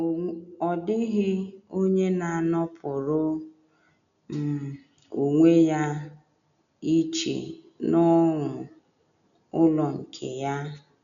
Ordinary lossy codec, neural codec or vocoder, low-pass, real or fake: none; none; 7.2 kHz; real